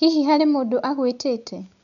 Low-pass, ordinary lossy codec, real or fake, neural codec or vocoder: 7.2 kHz; none; real; none